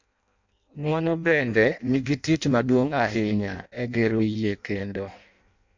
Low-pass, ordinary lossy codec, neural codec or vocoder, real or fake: 7.2 kHz; MP3, 64 kbps; codec, 16 kHz in and 24 kHz out, 0.6 kbps, FireRedTTS-2 codec; fake